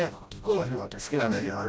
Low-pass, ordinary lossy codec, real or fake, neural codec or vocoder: none; none; fake; codec, 16 kHz, 0.5 kbps, FreqCodec, smaller model